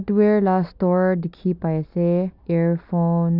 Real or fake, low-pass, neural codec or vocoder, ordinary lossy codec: real; 5.4 kHz; none; none